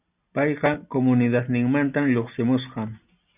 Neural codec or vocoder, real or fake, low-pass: none; real; 3.6 kHz